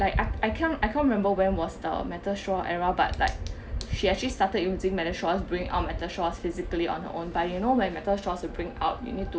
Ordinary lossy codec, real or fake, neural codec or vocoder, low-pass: none; real; none; none